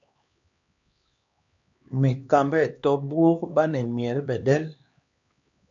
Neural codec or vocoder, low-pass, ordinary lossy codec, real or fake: codec, 16 kHz, 2 kbps, X-Codec, HuBERT features, trained on LibriSpeech; 7.2 kHz; AAC, 64 kbps; fake